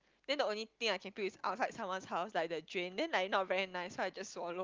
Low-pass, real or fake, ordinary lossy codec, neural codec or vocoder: 7.2 kHz; real; Opus, 32 kbps; none